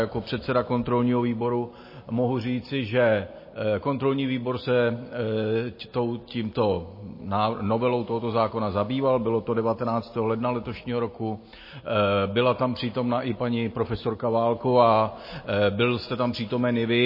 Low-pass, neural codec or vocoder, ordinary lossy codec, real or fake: 5.4 kHz; none; MP3, 24 kbps; real